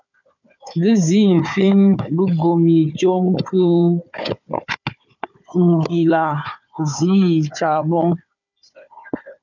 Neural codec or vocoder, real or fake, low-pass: codec, 16 kHz, 4 kbps, FunCodec, trained on Chinese and English, 50 frames a second; fake; 7.2 kHz